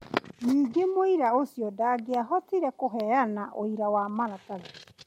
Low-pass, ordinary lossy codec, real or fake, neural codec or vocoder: 19.8 kHz; MP3, 64 kbps; real; none